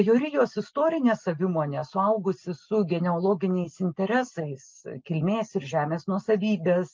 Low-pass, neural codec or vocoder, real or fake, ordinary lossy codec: 7.2 kHz; none; real; Opus, 24 kbps